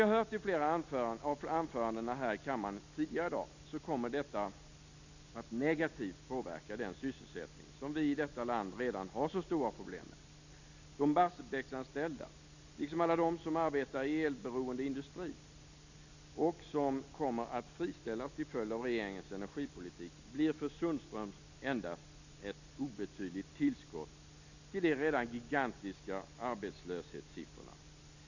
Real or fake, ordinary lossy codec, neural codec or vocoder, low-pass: real; none; none; 7.2 kHz